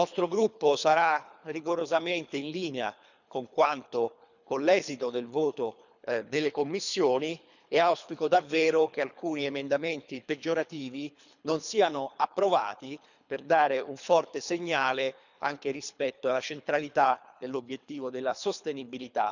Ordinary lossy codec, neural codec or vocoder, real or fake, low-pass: none; codec, 24 kHz, 3 kbps, HILCodec; fake; 7.2 kHz